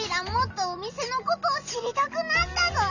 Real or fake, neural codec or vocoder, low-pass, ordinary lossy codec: real; none; 7.2 kHz; none